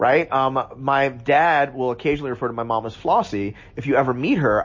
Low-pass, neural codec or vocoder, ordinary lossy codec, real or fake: 7.2 kHz; none; MP3, 32 kbps; real